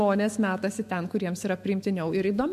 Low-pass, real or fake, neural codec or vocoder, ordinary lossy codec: 14.4 kHz; fake; autoencoder, 48 kHz, 128 numbers a frame, DAC-VAE, trained on Japanese speech; MP3, 64 kbps